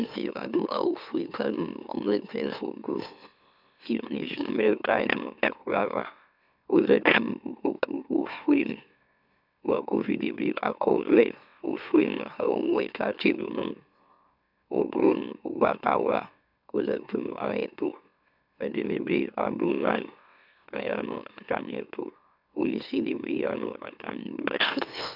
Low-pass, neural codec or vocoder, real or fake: 5.4 kHz; autoencoder, 44.1 kHz, a latent of 192 numbers a frame, MeloTTS; fake